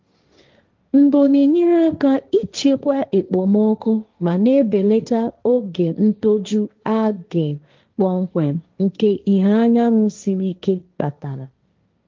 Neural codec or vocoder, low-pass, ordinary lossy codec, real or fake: codec, 16 kHz, 1.1 kbps, Voila-Tokenizer; 7.2 kHz; Opus, 32 kbps; fake